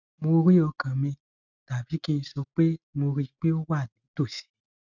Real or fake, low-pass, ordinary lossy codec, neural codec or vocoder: real; 7.2 kHz; none; none